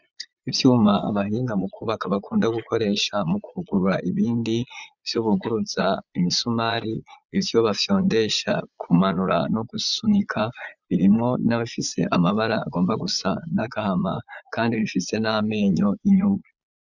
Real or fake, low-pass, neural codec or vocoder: fake; 7.2 kHz; vocoder, 22.05 kHz, 80 mel bands, Vocos